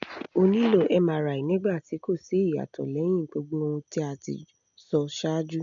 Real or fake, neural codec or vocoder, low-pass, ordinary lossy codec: real; none; 7.2 kHz; none